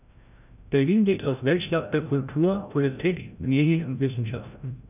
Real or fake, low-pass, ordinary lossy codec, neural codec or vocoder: fake; 3.6 kHz; none; codec, 16 kHz, 0.5 kbps, FreqCodec, larger model